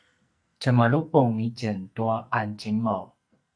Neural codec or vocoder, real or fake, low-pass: codec, 44.1 kHz, 2.6 kbps, SNAC; fake; 9.9 kHz